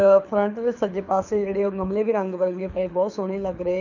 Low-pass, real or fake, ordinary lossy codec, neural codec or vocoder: 7.2 kHz; fake; none; codec, 24 kHz, 6 kbps, HILCodec